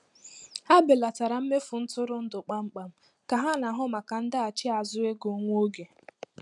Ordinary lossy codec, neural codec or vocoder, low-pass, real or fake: none; none; 10.8 kHz; real